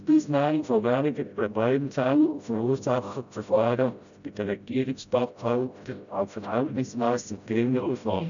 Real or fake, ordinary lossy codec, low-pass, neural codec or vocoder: fake; none; 7.2 kHz; codec, 16 kHz, 0.5 kbps, FreqCodec, smaller model